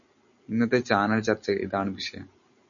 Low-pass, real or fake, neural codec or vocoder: 7.2 kHz; real; none